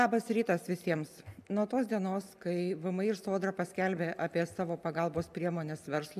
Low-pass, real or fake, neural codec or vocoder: 14.4 kHz; real; none